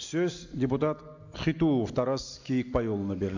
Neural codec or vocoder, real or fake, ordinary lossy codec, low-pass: none; real; AAC, 48 kbps; 7.2 kHz